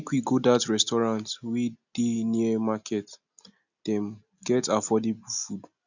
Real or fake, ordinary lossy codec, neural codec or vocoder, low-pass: real; none; none; 7.2 kHz